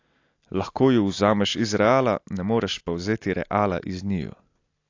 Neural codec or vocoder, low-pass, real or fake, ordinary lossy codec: none; 7.2 kHz; real; AAC, 48 kbps